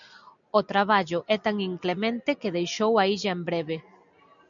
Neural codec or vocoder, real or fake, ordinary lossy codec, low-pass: none; real; MP3, 96 kbps; 7.2 kHz